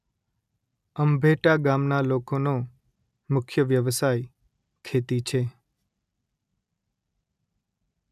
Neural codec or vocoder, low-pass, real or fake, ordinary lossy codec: none; 14.4 kHz; real; none